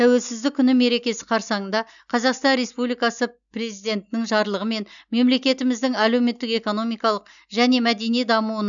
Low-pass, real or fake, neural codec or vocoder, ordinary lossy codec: 7.2 kHz; real; none; none